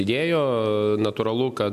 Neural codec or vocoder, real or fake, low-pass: none; real; 14.4 kHz